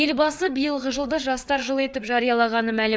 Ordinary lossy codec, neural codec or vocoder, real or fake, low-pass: none; codec, 16 kHz, 4 kbps, FreqCodec, larger model; fake; none